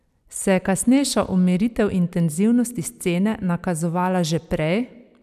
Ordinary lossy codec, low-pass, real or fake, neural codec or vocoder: none; 14.4 kHz; fake; vocoder, 44.1 kHz, 128 mel bands every 256 samples, BigVGAN v2